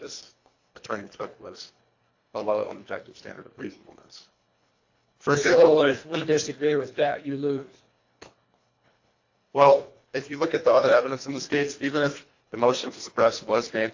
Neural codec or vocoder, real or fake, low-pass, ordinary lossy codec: codec, 24 kHz, 1.5 kbps, HILCodec; fake; 7.2 kHz; AAC, 48 kbps